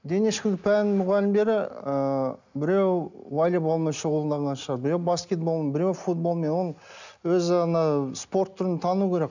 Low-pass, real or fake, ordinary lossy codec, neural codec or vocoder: 7.2 kHz; real; none; none